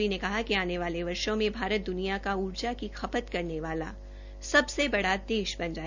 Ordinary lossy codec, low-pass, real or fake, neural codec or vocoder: none; 7.2 kHz; real; none